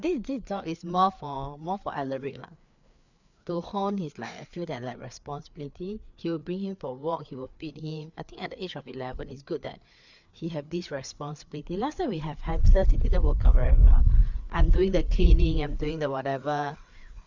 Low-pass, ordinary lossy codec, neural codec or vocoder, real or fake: 7.2 kHz; none; codec, 16 kHz, 4 kbps, FreqCodec, larger model; fake